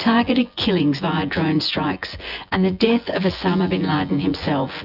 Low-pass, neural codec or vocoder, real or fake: 5.4 kHz; vocoder, 24 kHz, 100 mel bands, Vocos; fake